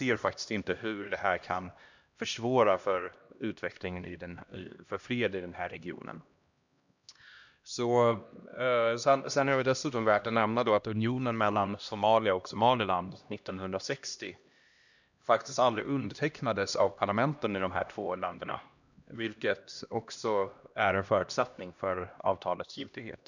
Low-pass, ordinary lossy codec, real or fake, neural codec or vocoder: 7.2 kHz; none; fake; codec, 16 kHz, 1 kbps, X-Codec, HuBERT features, trained on LibriSpeech